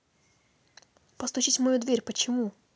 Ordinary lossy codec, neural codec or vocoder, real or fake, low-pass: none; none; real; none